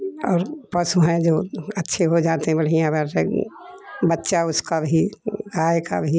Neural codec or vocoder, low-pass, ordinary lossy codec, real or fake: none; none; none; real